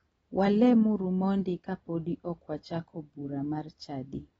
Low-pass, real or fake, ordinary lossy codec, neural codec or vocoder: 19.8 kHz; real; AAC, 24 kbps; none